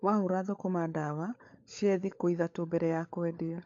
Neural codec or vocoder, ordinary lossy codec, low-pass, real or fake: codec, 16 kHz, 16 kbps, FunCodec, trained on LibriTTS, 50 frames a second; AAC, 48 kbps; 7.2 kHz; fake